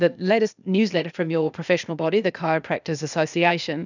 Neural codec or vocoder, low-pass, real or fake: codec, 16 kHz, 0.8 kbps, ZipCodec; 7.2 kHz; fake